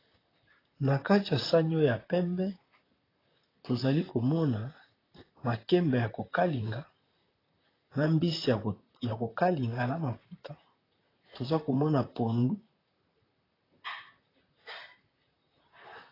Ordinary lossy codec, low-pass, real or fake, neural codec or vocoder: AAC, 24 kbps; 5.4 kHz; fake; vocoder, 44.1 kHz, 128 mel bands, Pupu-Vocoder